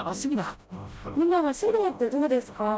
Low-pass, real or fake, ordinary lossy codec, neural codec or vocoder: none; fake; none; codec, 16 kHz, 0.5 kbps, FreqCodec, smaller model